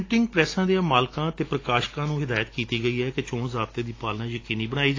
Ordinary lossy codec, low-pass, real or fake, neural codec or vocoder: AAC, 32 kbps; 7.2 kHz; real; none